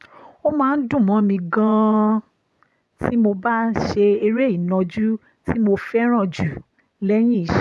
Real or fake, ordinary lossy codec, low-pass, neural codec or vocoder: fake; none; none; vocoder, 24 kHz, 100 mel bands, Vocos